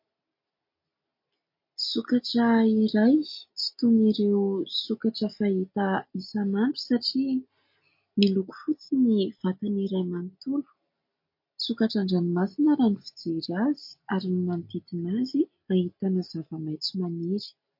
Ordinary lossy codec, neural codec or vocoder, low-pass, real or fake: MP3, 32 kbps; none; 5.4 kHz; real